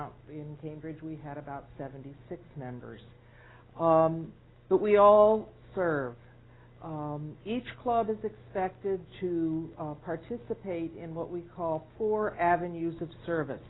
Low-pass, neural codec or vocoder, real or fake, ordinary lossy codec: 7.2 kHz; none; real; AAC, 16 kbps